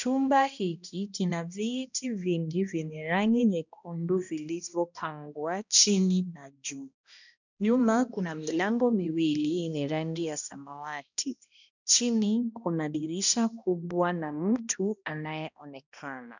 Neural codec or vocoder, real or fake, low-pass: codec, 16 kHz, 1 kbps, X-Codec, HuBERT features, trained on balanced general audio; fake; 7.2 kHz